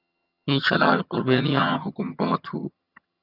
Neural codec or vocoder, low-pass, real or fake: vocoder, 22.05 kHz, 80 mel bands, HiFi-GAN; 5.4 kHz; fake